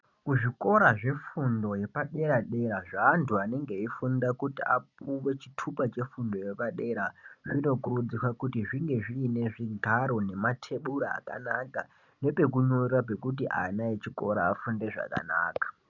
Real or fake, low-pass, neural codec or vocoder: real; 7.2 kHz; none